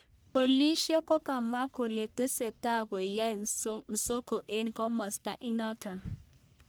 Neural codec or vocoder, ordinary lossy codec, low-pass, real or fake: codec, 44.1 kHz, 1.7 kbps, Pupu-Codec; none; none; fake